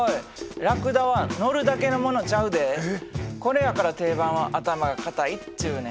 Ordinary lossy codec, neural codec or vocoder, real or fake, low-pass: none; none; real; none